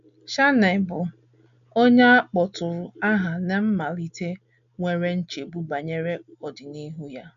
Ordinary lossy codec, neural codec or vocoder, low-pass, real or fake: AAC, 96 kbps; none; 7.2 kHz; real